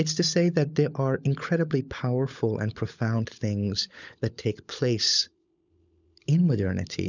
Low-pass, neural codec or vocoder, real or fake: 7.2 kHz; codec, 16 kHz, 16 kbps, FunCodec, trained on LibriTTS, 50 frames a second; fake